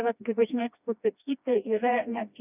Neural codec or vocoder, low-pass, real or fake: codec, 16 kHz, 1 kbps, FreqCodec, smaller model; 3.6 kHz; fake